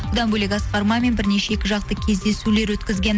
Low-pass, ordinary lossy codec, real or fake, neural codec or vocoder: none; none; real; none